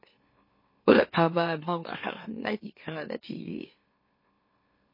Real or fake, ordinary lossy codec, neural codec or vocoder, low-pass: fake; MP3, 24 kbps; autoencoder, 44.1 kHz, a latent of 192 numbers a frame, MeloTTS; 5.4 kHz